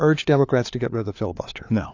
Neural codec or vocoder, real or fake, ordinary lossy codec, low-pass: codec, 16 kHz, 4 kbps, FunCodec, trained on LibriTTS, 50 frames a second; fake; AAC, 48 kbps; 7.2 kHz